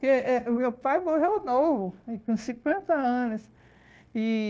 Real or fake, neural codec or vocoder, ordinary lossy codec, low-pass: fake; codec, 16 kHz, 0.9 kbps, LongCat-Audio-Codec; none; none